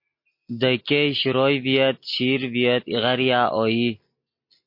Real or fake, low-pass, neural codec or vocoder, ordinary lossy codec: real; 5.4 kHz; none; MP3, 32 kbps